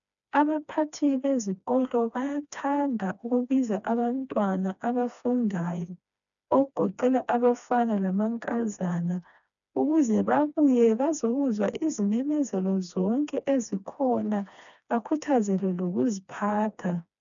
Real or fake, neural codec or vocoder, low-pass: fake; codec, 16 kHz, 2 kbps, FreqCodec, smaller model; 7.2 kHz